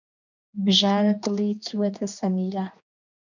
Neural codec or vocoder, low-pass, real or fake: codec, 16 kHz, 2 kbps, X-Codec, HuBERT features, trained on general audio; 7.2 kHz; fake